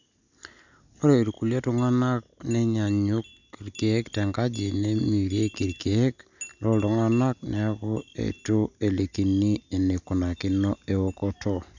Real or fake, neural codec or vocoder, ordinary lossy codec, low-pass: real; none; none; 7.2 kHz